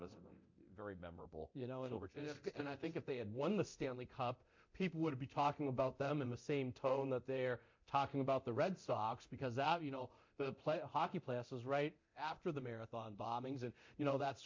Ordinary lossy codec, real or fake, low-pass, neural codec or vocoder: MP3, 48 kbps; fake; 7.2 kHz; codec, 24 kHz, 0.9 kbps, DualCodec